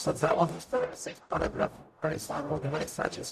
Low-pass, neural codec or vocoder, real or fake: 14.4 kHz; codec, 44.1 kHz, 0.9 kbps, DAC; fake